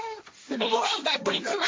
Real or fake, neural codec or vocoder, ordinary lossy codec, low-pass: fake; codec, 16 kHz, 1.1 kbps, Voila-Tokenizer; none; none